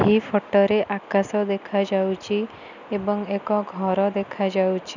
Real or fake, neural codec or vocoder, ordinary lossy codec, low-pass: real; none; none; 7.2 kHz